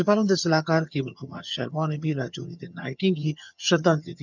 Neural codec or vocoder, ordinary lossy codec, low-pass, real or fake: vocoder, 22.05 kHz, 80 mel bands, HiFi-GAN; none; 7.2 kHz; fake